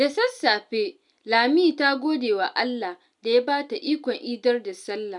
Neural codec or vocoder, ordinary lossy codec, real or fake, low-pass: none; none; real; 10.8 kHz